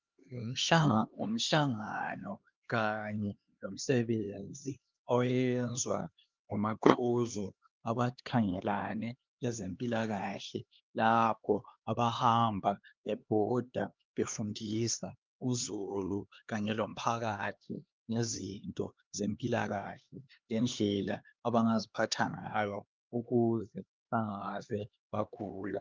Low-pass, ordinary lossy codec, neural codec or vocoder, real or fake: 7.2 kHz; Opus, 24 kbps; codec, 16 kHz, 2 kbps, X-Codec, HuBERT features, trained on LibriSpeech; fake